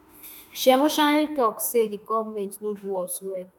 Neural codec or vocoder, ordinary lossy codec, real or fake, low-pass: autoencoder, 48 kHz, 32 numbers a frame, DAC-VAE, trained on Japanese speech; none; fake; none